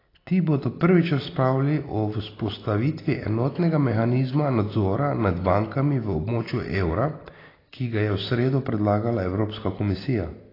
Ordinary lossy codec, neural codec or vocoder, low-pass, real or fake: AAC, 24 kbps; none; 5.4 kHz; real